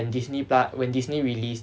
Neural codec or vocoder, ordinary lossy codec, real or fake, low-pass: none; none; real; none